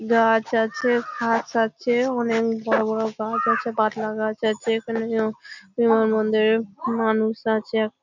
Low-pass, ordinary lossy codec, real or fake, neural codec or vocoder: 7.2 kHz; none; real; none